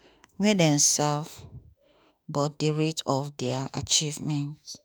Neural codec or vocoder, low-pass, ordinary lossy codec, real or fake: autoencoder, 48 kHz, 32 numbers a frame, DAC-VAE, trained on Japanese speech; none; none; fake